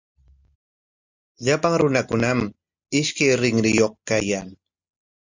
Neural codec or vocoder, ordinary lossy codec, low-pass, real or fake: none; Opus, 64 kbps; 7.2 kHz; real